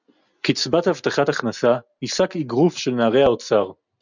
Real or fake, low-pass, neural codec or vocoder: real; 7.2 kHz; none